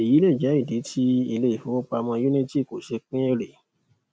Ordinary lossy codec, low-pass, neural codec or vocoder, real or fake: none; none; none; real